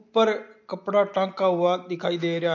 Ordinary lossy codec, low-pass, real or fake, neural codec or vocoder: MP3, 48 kbps; 7.2 kHz; real; none